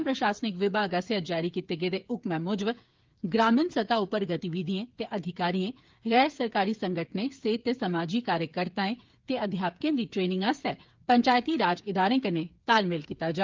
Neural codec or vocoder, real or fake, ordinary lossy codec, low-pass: codec, 16 kHz, 8 kbps, FreqCodec, smaller model; fake; Opus, 32 kbps; 7.2 kHz